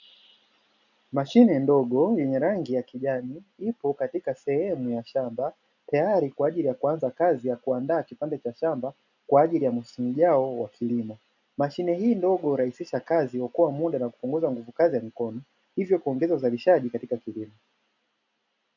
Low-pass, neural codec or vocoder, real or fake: 7.2 kHz; none; real